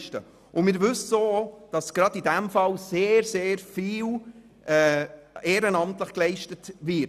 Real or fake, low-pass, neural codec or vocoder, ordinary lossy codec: real; 14.4 kHz; none; none